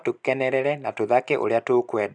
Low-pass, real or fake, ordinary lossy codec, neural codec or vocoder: 9.9 kHz; real; none; none